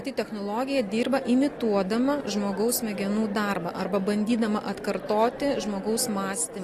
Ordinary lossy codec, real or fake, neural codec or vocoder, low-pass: AAC, 48 kbps; real; none; 14.4 kHz